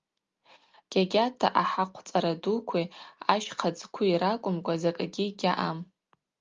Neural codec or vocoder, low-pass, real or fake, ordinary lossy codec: none; 7.2 kHz; real; Opus, 32 kbps